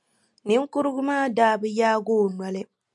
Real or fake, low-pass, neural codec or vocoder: real; 10.8 kHz; none